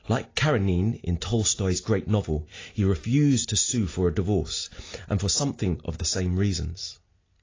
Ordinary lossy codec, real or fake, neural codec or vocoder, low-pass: AAC, 32 kbps; real; none; 7.2 kHz